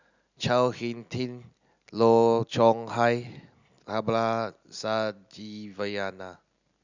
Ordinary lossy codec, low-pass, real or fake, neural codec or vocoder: none; 7.2 kHz; real; none